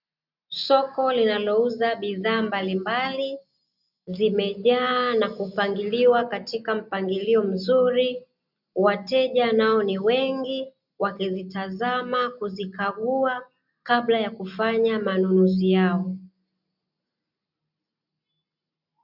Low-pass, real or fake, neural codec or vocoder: 5.4 kHz; real; none